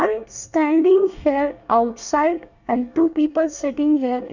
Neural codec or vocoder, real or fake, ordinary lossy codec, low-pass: codec, 24 kHz, 1 kbps, SNAC; fake; none; 7.2 kHz